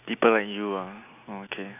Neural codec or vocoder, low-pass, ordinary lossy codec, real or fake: none; 3.6 kHz; none; real